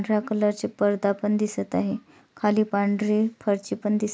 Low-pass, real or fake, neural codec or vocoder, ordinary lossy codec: none; real; none; none